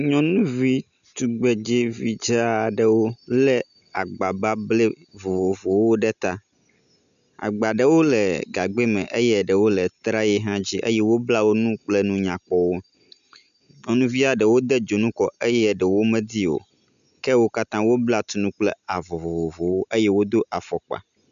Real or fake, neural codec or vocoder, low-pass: real; none; 7.2 kHz